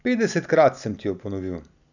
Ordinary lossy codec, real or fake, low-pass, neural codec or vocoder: none; real; 7.2 kHz; none